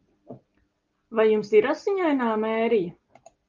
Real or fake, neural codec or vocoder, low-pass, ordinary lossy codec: real; none; 7.2 kHz; Opus, 24 kbps